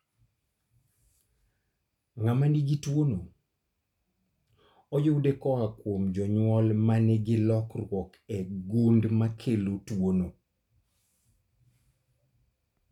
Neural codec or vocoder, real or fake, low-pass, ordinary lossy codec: none; real; 19.8 kHz; none